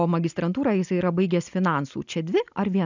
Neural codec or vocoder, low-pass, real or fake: none; 7.2 kHz; real